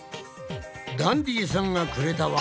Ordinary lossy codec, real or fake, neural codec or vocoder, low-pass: none; real; none; none